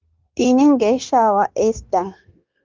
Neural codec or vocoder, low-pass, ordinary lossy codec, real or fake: codec, 16 kHz, 0.9 kbps, LongCat-Audio-Codec; 7.2 kHz; Opus, 32 kbps; fake